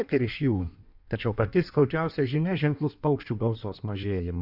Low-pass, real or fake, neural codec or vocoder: 5.4 kHz; fake; codec, 16 kHz in and 24 kHz out, 1.1 kbps, FireRedTTS-2 codec